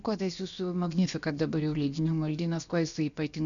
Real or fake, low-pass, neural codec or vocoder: fake; 7.2 kHz; codec, 16 kHz, about 1 kbps, DyCAST, with the encoder's durations